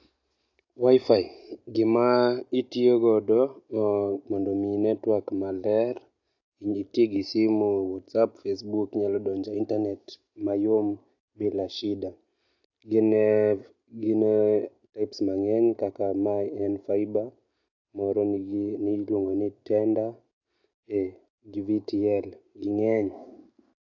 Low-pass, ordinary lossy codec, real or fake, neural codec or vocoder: 7.2 kHz; AAC, 48 kbps; real; none